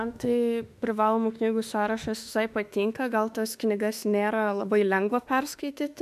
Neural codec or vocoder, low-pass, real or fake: autoencoder, 48 kHz, 32 numbers a frame, DAC-VAE, trained on Japanese speech; 14.4 kHz; fake